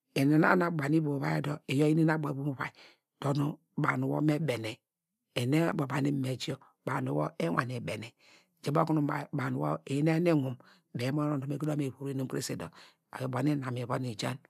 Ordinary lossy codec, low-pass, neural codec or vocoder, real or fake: none; 14.4 kHz; none; real